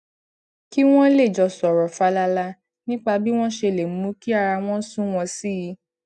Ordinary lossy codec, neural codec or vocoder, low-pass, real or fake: none; none; 10.8 kHz; real